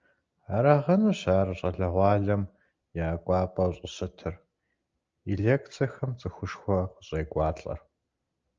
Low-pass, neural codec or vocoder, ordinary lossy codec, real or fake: 7.2 kHz; none; Opus, 24 kbps; real